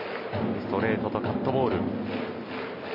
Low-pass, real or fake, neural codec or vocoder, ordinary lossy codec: 5.4 kHz; real; none; MP3, 24 kbps